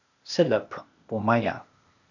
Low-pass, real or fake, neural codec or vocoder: 7.2 kHz; fake; codec, 16 kHz, 0.8 kbps, ZipCodec